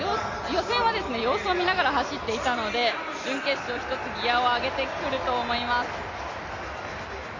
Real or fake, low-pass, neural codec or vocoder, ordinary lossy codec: real; 7.2 kHz; none; AAC, 32 kbps